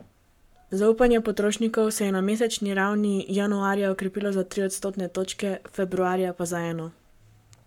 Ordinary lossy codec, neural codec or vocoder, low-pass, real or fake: MP3, 96 kbps; codec, 44.1 kHz, 7.8 kbps, Pupu-Codec; 19.8 kHz; fake